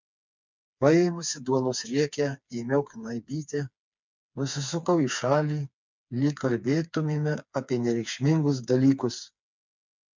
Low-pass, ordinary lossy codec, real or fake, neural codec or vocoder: 7.2 kHz; MP3, 64 kbps; fake; codec, 16 kHz, 4 kbps, FreqCodec, smaller model